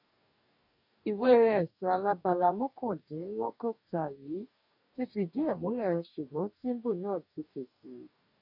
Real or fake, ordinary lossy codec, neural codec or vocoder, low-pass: fake; none; codec, 44.1 kHz, 2.6 kbps, DAC; 5.4 kHz